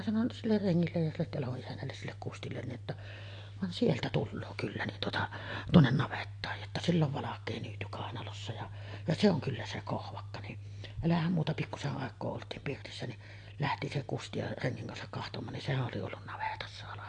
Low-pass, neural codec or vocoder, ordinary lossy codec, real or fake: 9.9 kHz; none; AAC, 48 kbps; real